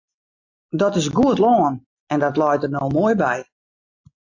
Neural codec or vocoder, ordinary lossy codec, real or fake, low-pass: none; AAC, 48 kbps; real; 7.2 kHz